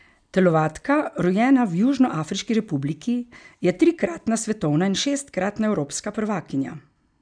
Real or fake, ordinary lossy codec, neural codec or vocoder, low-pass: real; none; none; 9.9 kHz